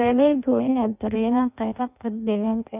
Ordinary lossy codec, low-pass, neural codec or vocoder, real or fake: none; 3.6 kHz; codec, 16 kHz in and 24 kHz out, 0.6 kbps, FireRedTTS-2 codec; fake